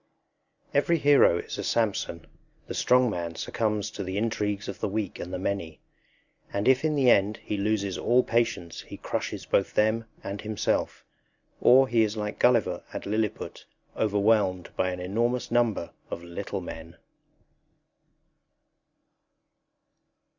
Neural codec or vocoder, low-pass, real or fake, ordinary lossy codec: none; 7.2 kHz; real; Opus, 64 kbps